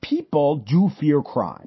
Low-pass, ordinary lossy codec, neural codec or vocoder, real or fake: 7.2 kHz; MP3, 24 kbps; none; real